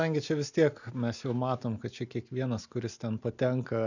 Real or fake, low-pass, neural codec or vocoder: real; 7.2 kHz; none